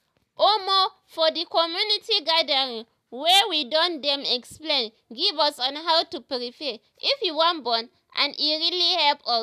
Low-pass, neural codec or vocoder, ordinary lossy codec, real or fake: 14.4 kHz; none; none; real